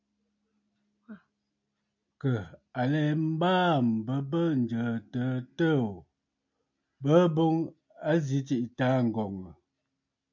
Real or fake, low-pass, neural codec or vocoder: real; 7.2 kHz; none